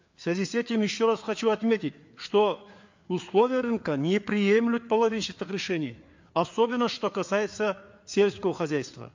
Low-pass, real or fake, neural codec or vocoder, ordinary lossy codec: 7.2 kHz; fake; codec, 16 kHz, 4 kbps, FreqCodec, larger model; MP3, 48 kbps